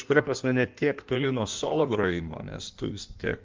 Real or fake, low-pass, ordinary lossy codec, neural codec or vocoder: fake; 7.2 kHz; Opus, 24 kbps; codec, 16 kHz in and 24 kHz out, 1.1 kbps, FireRedTTS-2 codec